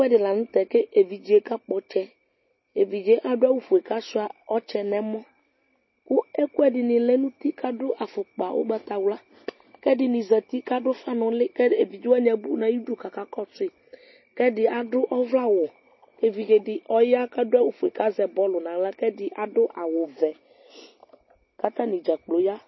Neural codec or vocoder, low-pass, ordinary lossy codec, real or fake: none; 7.2 kHz; MP3, 24 kbps; real